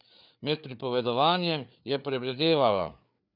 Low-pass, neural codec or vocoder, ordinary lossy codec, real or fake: 5.4 kHz; codec, 16 kHz, 4 kbps, FreqCodec, larger model; none; fake